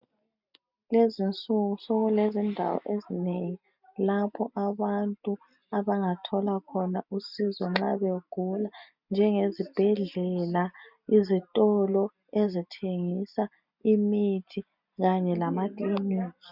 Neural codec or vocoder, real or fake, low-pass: none; real; 5.4 kHz